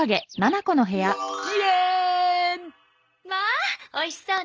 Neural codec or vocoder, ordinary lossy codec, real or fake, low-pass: none; Opus, 24 kbps; real; 7.2 kHz